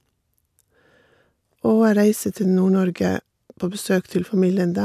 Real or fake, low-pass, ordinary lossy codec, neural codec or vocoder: real; 14.4 kHz; MP3, 96 kbps; none